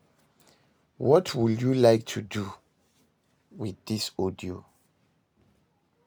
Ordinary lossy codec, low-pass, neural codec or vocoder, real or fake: none; none; none; real